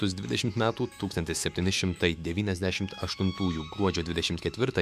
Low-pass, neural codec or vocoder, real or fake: 14.4 kHz; autoencoder, 48 kHz, 128 numbers a frame, DAC-VAE, trained on Japanese speech; fake